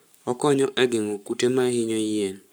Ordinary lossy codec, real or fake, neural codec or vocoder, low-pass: none; fake; codec, 44.1 kHz, 7.8 kbps, Pupu-Codec; none